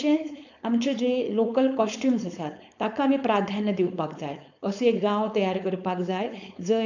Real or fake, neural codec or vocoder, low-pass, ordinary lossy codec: fake; codec, 16 kHz, 4.8 kbps, FACodec; 7.2 kHz; none